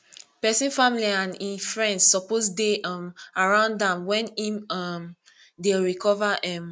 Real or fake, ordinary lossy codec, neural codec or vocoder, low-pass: real; none; none; none